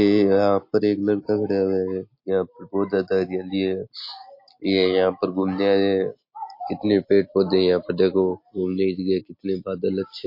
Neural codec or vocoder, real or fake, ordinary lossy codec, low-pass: none; real; MP3, 32 kbps; 5.4 kHz